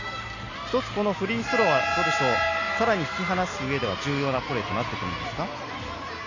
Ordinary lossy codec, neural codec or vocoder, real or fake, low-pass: AAC, 48 kbps; none; real; 7.2 kHz